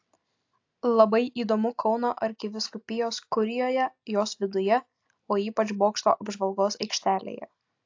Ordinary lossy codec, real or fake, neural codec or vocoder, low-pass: AAC, 48 kbps; real; none; 7.2 kHz